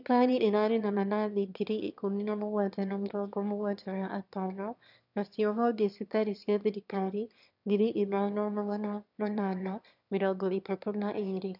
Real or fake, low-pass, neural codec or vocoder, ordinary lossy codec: fake; 5.4 kHz; autoencoder, 22.05 kHz, a latent of 192 numbers a frame, VITS, trained on one speaker; none